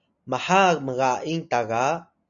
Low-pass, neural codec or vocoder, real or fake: 7.2 kHz; none; real